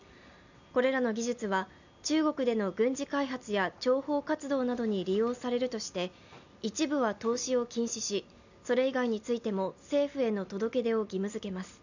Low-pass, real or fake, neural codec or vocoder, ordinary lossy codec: 7.2 kHz; real; none; none